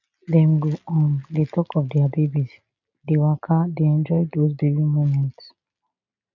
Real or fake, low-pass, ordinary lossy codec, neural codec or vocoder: real; 7.2 kHz; none; none